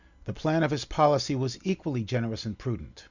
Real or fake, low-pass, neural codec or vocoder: real; 7.2 kHz; none